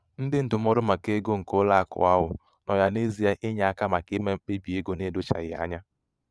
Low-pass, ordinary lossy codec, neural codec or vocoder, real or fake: none; none; vocoder, 22.05 kHz, 80 mel bands, Vocos; fake